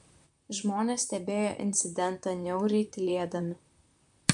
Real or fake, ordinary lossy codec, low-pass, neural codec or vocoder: fake; MP3, 64 kbps; 10.8 kHz; vocoder, 44.1 kHz, 128 mel bands, Pupu-Vocoder